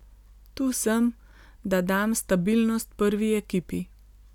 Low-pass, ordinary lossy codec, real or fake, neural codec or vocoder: 19.8 kHz; none; real; none